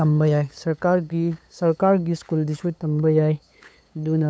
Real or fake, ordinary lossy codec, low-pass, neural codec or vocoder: fake; none; none; codec, 16 kHz, 8 kbps, FunCodec, trained on LibriTTS, 25 frames a second